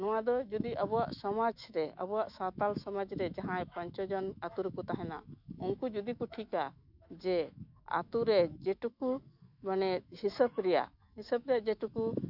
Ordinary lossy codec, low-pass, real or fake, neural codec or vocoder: none; 5.4 kHz; fake; autoencoder, 48 kHz, 128 numbers a frame, DAC-VAE, trained on Japanese speech